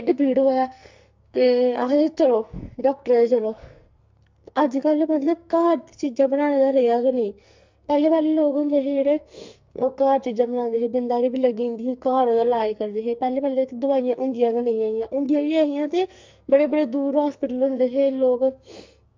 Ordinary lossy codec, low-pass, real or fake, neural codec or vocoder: MP3, 64 kbps; 7.2 kHz; fake; codec, 44.1 kHz, 2.6 kbps, SNAC